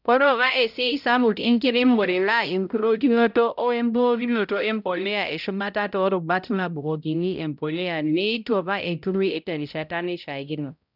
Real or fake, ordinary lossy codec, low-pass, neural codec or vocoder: fake; none; 5.4 kHz; codec, 16 kHz, 0.5 kbps, X-Codec, HuBERT features, trained on balanced general audio